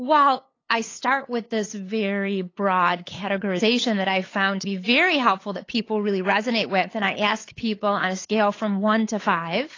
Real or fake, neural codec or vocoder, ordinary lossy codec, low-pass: fake; codec, 16 kHz, 8 kbps, FunCodec, trained on LibriTTS, 25 frames a second; AAC, 32 kbps; 7.2 kHz